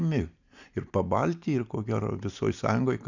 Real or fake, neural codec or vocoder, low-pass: real; none; 7.2 kHz